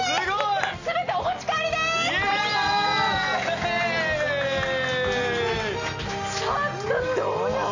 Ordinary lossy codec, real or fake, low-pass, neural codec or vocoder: AAC, 48 kbps; real; 7.2 kHz; none